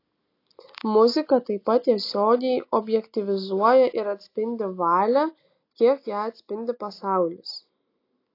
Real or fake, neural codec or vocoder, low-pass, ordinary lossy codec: real; none; 5.4 kHz; AAC, 32 kbps